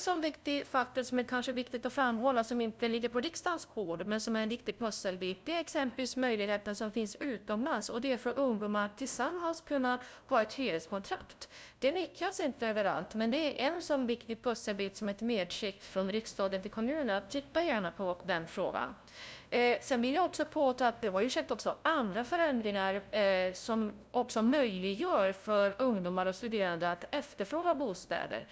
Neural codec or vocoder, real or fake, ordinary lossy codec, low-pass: codec, 16 kHz, 0.5 kbps, FunCodec, trained on LibriTTS, 25 frames a second; fake; none; none